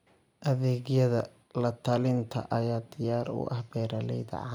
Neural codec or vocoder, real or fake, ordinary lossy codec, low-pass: none; real; none; 19.8 kHz